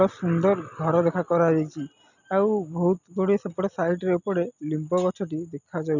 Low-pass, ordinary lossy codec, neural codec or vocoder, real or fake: 7.2 kHz; none; none; real